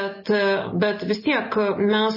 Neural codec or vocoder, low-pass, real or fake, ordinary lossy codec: none; 5.4 kHz; real; MP3, 24 kbps